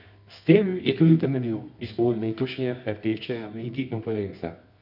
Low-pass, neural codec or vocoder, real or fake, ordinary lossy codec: 5.4 kHz; codec, 24 kHz, 0.9 kbps, WavTokenizer, medium music audio release; fake; none